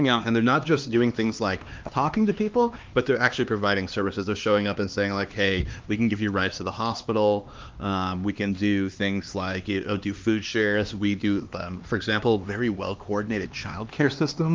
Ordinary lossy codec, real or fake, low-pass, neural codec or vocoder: Opus, 32 kbps; fake; 7.2 kHz; codec, 16 kHz, 2 kbps, X-Codec, HuBERT features, trained on LibriSpeech